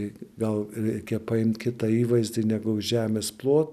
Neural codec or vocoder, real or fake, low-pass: none; real; 14.4 kHz